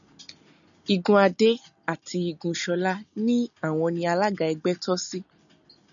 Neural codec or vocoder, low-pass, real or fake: none; 7.2 kHz; real